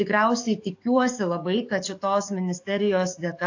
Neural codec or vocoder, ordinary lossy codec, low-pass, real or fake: codec, 44.1 kHz, 7.8 kbps, DAC; AAC, 48 kbps; 7.2 kHz; fake